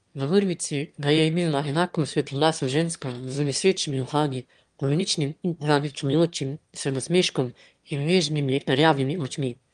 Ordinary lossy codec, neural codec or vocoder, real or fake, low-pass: Opus, 32 kbps; autoencoder, 22.05 kHz, a latent of 192 numbers a frame, VITS, trained on one speaker; fake; 9.9 kHz